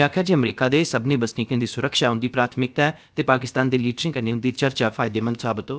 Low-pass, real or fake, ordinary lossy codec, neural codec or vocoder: none; fake; none; codec, 16 kHz, about 1 kbps, DyCAST, with the encoder's durations